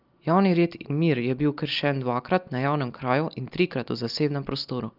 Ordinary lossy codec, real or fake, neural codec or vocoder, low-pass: Opus, 24 kbps; real; none; 5.4 kHz